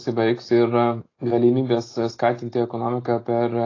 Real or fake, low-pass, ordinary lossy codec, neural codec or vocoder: real; 7.2 kHz; AAC, 32 kbps; none